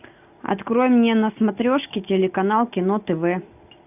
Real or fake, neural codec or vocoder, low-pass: real; none; 3.6 kHz